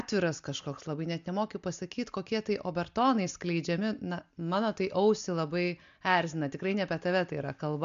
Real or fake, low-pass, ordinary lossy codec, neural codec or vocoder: real; 7.2 kHz; MP3, 64 kbps; none